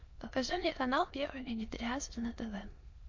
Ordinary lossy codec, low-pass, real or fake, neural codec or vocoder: MP3, 48 kbps; 7.2 kHz; fake; autoencoder, 22.05 kHz, a latent of 192 numbers a frame, VITS, trained on many speakers